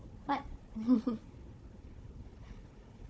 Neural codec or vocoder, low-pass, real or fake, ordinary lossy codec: codec, 16 kHz, 4 kbps, FunCodec, trained on Chinese and English, 50 frames a second; none; fake; none